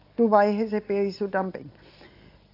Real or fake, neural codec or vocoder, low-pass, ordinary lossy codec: real; none; 5.4 kHz; AAC, 32 kbps